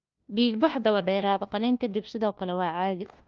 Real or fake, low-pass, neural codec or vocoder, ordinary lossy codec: fake; 7.2 kHz; codec, 16 kHz, 1 kbps, FunCodec, trained on LibriTTS, 50 frames a second; Opus, 24 kbps